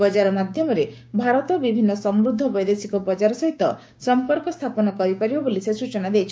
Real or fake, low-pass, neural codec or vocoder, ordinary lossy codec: fake; none; codec, 16 kHz, 6 kbps, DAC; none